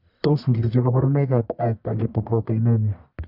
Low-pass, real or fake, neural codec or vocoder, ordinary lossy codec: 5.4 kHz; fake; codec, 44.1 kHz, 1.7 kbps, Pupu-Codec; none